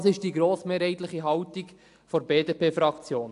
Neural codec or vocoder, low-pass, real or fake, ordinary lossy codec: none; 10.8 kHz; real; AAC, 64 kbps